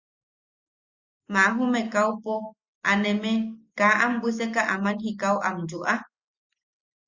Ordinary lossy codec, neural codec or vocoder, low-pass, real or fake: Opus, 64 kbps; none; 7.2 kHz; real